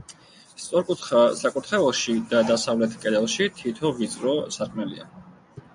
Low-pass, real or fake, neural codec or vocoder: 9.9 kHz; real; none